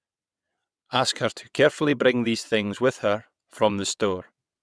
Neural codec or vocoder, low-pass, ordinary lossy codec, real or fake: vocoder, 22.05 kHz, 80 mel bands, WaveNeXt; none; none; fake